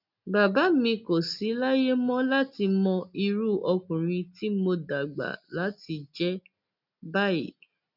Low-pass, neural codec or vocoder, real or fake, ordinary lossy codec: 5.4 kHz; none; real; none